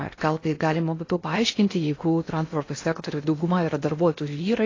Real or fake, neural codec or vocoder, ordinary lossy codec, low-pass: fake; codec, 16 kHz in and 24 kHz out, 0.6 kbps, FocalCodec, streaming, 4096 codes; AAC, 32 kbps; 7.2 kHz